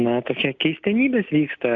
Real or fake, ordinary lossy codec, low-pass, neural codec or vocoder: real; AAC, 64 kbps; 7.2 kHz; none